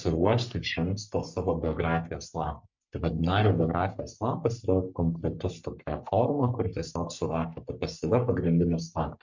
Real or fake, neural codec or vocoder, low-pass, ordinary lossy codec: fake; codec, 44.1 kHz, 3.4 kbps, Pupu-Codec; 7.2 kHz; MP3, 64 kbps